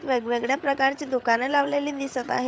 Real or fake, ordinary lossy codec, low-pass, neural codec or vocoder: fake; none; none; codec, 16 kHz, 8 kbps, FreqCodec, larger model